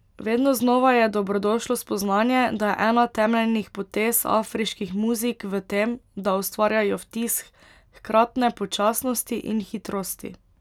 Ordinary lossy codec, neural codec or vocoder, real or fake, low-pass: none; none; real; 19.8 kHz